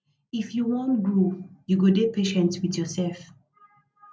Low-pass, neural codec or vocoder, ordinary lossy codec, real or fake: none; none; none; real